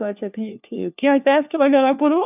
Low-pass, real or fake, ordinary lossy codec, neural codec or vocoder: 3.6 kHz; fake; none; codec, 16 kHz, 1 kbps, FunCodec, trained on LibriTTS, 50 frames a second